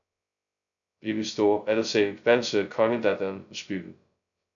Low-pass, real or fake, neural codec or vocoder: 7.2 kHz; fake; codec, 16 kHz, 0.2 kbps, FocalCodec